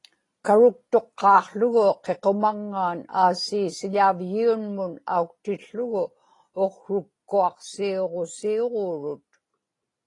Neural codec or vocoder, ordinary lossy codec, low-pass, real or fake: none; AAC, 32 kbps; 10.8 kHz; real